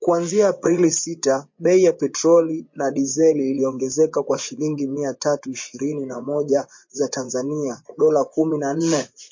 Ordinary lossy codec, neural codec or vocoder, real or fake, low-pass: MP3, 48 kbps; vocoder, 24 kHz, 100 mel bands, Vocos; fake; 7.2 kHz